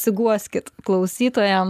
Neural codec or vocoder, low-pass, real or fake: none; 14.4 kHz; real